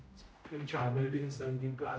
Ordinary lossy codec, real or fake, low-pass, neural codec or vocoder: none; fake; none; codec, 16 kHz, 0.5 kbps, X-Codec, HuBERT features, trained on general audio